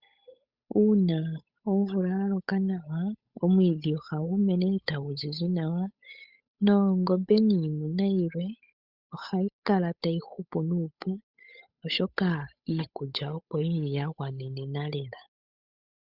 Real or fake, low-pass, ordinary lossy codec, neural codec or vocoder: fake; 5.4 kHz; Opus, 64 kbps; codec, 16 kHz, 8 kbps, FunCodec, trained on Chinese and English, 25 frames a second